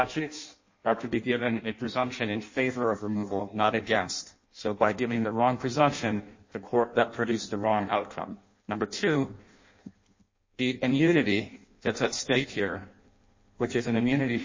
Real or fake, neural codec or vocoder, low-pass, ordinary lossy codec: fake; codec, 16 kHz in and 24 kHz out, 0.6 kbps, FireRedTTS-2 codec; 7.2 kHz; MP3, 32 kbps